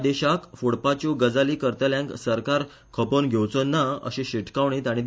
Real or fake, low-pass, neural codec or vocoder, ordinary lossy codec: real; none; none; none